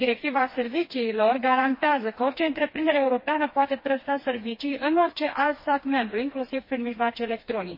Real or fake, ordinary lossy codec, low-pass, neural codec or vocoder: fake; MP3, 24 kbps; 5.4 kHz; codec, 16 kHz, 2 kbps, FreqCodec, smaller model